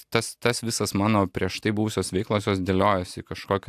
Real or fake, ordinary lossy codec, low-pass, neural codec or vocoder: real; AAC, 96 kbps; 14.4 kHz; none